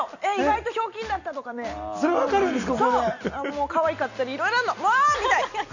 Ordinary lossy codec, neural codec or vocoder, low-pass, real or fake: none; none; 7.2 kHz; real